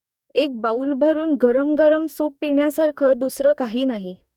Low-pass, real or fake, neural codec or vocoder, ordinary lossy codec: 19.8 kHz; fake; codec, 44.1 kHz, 2.6 kbps, DAC; none